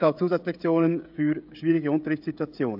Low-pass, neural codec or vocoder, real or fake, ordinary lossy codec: 5.4 kHz; codec, 16 kHz, 16 kbps, FreqCodec, smaller model; fake; AAC, 48 kbps